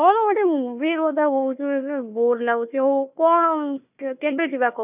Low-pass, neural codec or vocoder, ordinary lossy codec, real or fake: 3.6 kHz; codec, 16 kHz, 1 kbps, FunCodec, trained on Chinese and English, 50 frames a second; none; fake